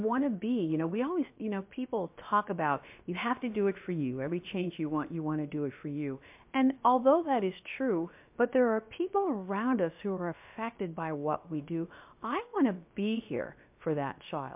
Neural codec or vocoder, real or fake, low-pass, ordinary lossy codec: codec, 16 kHz, about 1 kbps, DyCAST, with the encoder's durations; fake; 3.6 kHz; MP3, 32 kbps